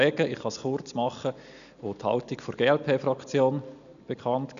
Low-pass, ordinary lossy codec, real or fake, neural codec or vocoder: 7.2 kHz; none; real; none